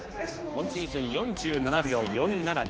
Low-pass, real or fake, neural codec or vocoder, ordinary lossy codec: none; fake; codec, 16 kHz, 2 kbps, X-Codec, HuBERT features, trained on general audio; none